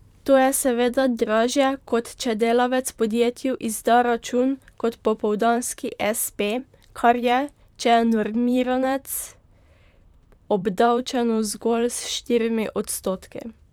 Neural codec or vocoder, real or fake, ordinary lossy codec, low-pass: vocoder, 44.1 kHz, 128 mel bands, Pupu-Vocoder; fake; none; 19.8 kHz